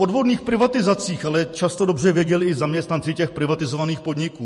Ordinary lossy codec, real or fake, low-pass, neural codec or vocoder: MP3, 48 kbps; fake; 14.4 kHz; vocoder, 48 kHz, 128 mel bands, Vocos